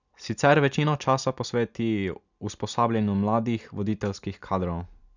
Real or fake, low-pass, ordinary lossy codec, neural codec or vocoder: real; 7.2 kHz; none; none